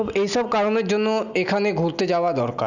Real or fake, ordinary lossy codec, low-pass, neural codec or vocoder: real; none; 7.2 kHz; none